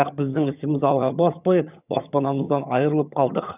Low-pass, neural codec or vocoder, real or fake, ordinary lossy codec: 3.6 kHz; vocoder, 22.05 kHz, 80 mel bands, HiFi-GAN; fake; none